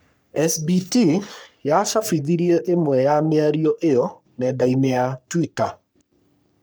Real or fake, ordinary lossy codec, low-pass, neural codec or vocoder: fake; none; none; codec, 44.1 kHz, 3.4 kbps, Pupu-Codec